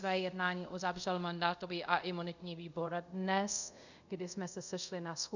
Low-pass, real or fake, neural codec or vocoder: 7.2 kHz; fake; codec, 24 kHz, 0.5 kbps, DualCodec